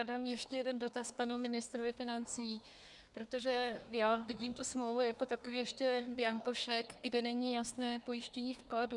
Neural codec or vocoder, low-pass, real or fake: codec, 24 kHz, 1 kbps, SNAC; 10.8 kHz; fake